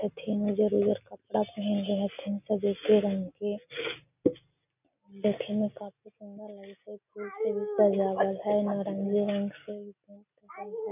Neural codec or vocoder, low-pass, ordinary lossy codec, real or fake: none; 3.6 kHz; none; real